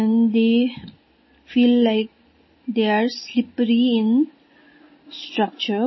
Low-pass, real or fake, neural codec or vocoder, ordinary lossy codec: 7.2 kHz; real; none; MP3, 24 kbps